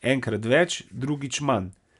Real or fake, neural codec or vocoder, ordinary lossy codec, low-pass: real; none; none; 10.8 kHz